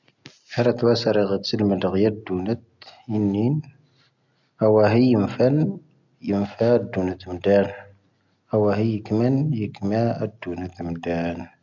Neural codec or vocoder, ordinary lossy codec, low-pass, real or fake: none; none; 7.2 kHz; real